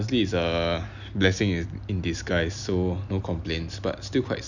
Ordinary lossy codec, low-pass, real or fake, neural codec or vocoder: none; 7.2 kHz; real; none